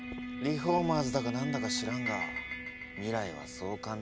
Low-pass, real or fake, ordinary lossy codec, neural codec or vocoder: none; real; none; none